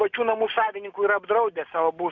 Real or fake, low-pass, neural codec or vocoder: real; 7.2 kHz; none